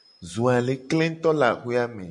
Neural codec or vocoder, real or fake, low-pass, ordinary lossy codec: none; real; 10.8 kHz; MP3, 96 kbps